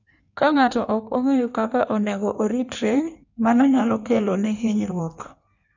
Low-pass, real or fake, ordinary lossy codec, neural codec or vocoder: 7.2 kHz; fake; none; codec, 16 kHz in and 24 kHz out, 1.1 kbps, FireRedTTS-2 codec